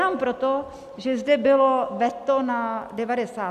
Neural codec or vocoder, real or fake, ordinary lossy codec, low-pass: none; real; AAC, 96 kbps; 14.4 kHz